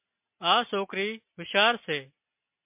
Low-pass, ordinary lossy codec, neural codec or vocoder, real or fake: 3.6 kHz; MP3, 32 kbps; none; real